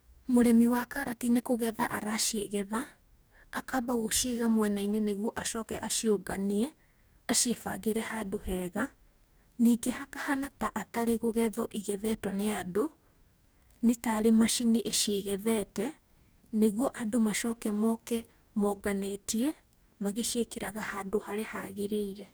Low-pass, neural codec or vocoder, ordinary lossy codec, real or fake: none; codec, 44.1 kHz, 2.6 kbps, DAC; none; fake